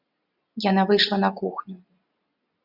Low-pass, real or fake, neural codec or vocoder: 5.4 kHz; real; none